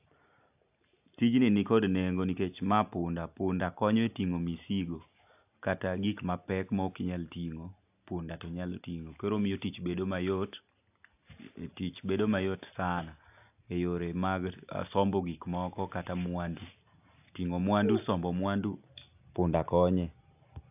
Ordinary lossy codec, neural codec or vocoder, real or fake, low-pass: AAC, 32 kbps; none; real; 3.6 kHz